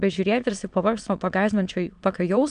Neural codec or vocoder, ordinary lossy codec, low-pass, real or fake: autoencoder, 22.05 kHz, a latent of 192 numbers a frame, VITS, trained on many speakers; MP3, 96 kbps; 9.9 kHz; fake